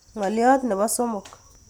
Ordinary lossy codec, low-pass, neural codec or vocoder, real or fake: none; none; none; real